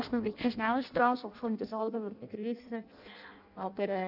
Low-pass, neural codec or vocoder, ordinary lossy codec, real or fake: 5.4 kHz; codec, 16 kHz in and 24 kHz out, 0.6 kbps, FireRedTTS-2 codec; none; fake